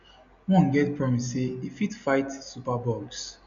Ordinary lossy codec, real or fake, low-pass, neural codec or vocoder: none; real; 7.2 kHz; none